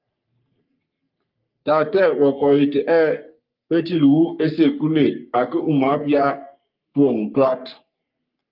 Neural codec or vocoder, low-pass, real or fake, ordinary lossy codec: codec, 44.1 kHz, 3.4 kbps, Pupu-Codec; 5.4 kHz; fake; Opus, 24 kbps